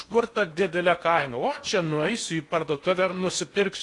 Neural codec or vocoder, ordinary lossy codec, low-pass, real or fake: codec, 16 kHz in and 24 kHz out, 0.8 kbps, FocalCodec, streaming, 65536 codes; AAC, 48 kbps; 10.8 kHz; fake